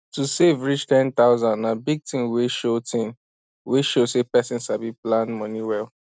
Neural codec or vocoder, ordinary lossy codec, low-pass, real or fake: none; none; none; real